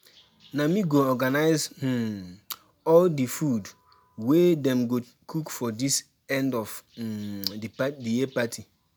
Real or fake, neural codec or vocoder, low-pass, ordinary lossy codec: real; none; none; none